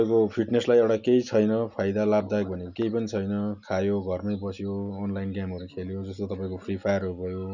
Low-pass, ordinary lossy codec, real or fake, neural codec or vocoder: 7.2 kHz; none; real; none